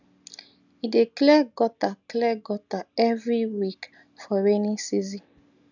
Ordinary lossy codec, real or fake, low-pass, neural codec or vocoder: none; real; 7.2 kHz; none